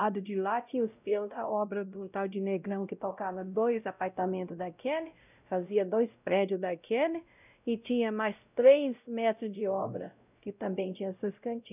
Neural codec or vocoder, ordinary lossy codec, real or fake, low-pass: codec, 16 kHz, 0.5 kbps, X-Codec, WavLM features, trained on Multilingual LibriSpeech; none; fake; 3.6 kHz